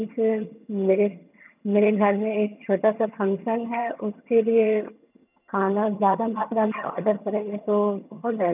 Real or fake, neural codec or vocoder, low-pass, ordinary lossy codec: fake; vocoder, 22.05 kHz, 80 mel bands, HiFi-GAN; 3.6 kHz; none